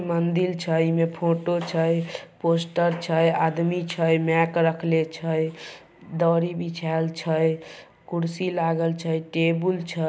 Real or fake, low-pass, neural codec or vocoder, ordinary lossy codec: real; none; none; none